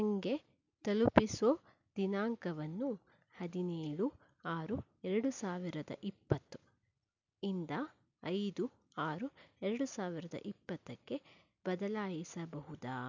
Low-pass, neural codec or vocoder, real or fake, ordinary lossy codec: 7.2 kHz; none; real; none